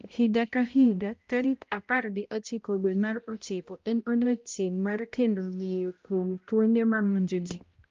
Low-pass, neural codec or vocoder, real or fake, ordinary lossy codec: 7.2 kHz; codec, 16 kHz, 0.5 kbps, X-Codec, HuBERT features, trained on balanced general audio; fake; Opus, 32 kbps